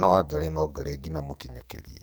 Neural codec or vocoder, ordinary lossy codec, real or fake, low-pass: codec, 44.1 kHz, 2.6 kbps, SNAC; none; fake; none